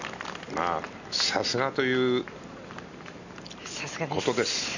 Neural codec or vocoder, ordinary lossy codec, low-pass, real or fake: none; none; 7.2 kHz; real